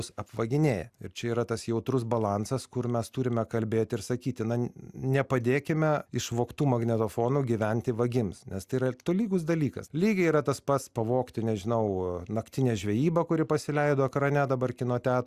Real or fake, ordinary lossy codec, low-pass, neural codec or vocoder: real; Opus, 64 kbps; 14.4 kHz; none